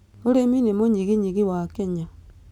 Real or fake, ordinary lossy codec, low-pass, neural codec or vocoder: real; none; 19.8 kHz; none